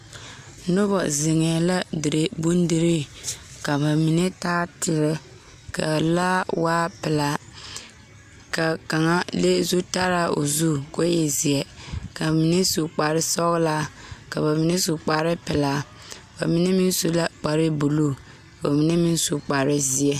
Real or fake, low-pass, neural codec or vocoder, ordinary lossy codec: real; 14.4 kHz; none; AAC, 96 kbps